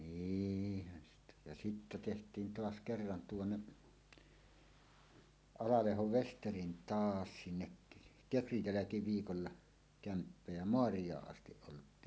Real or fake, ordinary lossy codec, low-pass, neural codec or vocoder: real; none; none; none